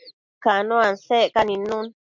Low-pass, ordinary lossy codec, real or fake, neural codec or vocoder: 7.2 kHz; MP3, 64 kbps; real; none